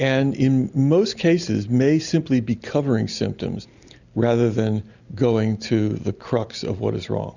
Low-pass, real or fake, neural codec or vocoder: 7.2 kHz; real; none